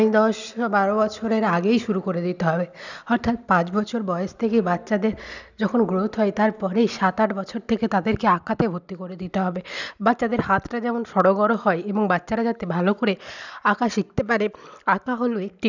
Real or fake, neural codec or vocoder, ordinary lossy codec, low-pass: real; none; none; 7.2 kHz